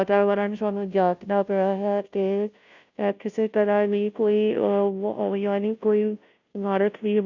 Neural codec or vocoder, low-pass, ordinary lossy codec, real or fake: codec, 16 kHz, 0.5 kbps, FunCodec, trained on Chinese and English, 25 frames a second; 7.2 kHz; none; fake